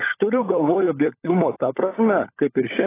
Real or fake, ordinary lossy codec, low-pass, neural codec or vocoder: fake; AAC, 16 kbps; 3.6 kHz; codec, 16 kHz, 16 kbps, FunCodec, trained on LibriTTS, 50 frames a second